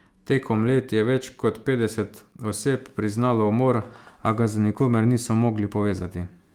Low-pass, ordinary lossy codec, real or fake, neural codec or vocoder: 19.8 kHz; Opus, 32 kbps; fake; autoencoder, 48 kHz, 128 numbers a frame, DAC-VAE, trained on Japanese speech